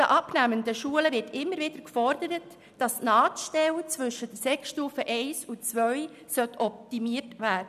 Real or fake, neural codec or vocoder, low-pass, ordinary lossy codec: real; none; 14.4 kHz; none